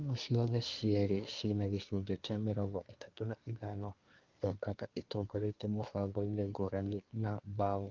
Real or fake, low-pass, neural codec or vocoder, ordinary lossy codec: fake; 7.2 kHz; codec, 24 kHz, 1 kbps, SNAC; Opus, 32 kbps